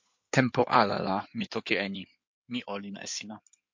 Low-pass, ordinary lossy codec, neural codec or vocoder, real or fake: 7.2 kHz; MP3, 48 kbps; codec, 16 kHz in and 24 kHz out, 2.2 kbps, FireRedTTS-2 codec; fake